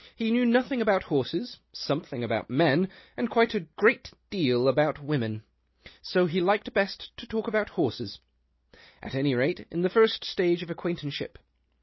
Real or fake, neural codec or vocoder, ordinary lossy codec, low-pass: real; none; MP3, 24 kbps; 7.2 kHz